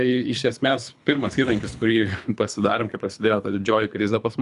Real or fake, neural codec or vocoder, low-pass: fake; codec, 24 kHz, 3 kbps, HILCodec; 10.8 kHz